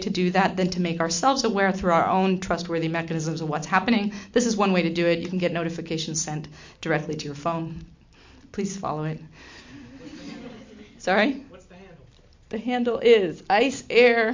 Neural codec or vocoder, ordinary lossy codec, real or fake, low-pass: none; MP3, 48 kbps; real; 7.2 kHz